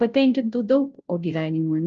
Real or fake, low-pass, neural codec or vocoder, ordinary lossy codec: fake; 7.2 kHz; codec, 16 kHz, 0.5 kbps, FunCodec, trained on Chinese and English, 25 frames a second; Opus, 24 kbps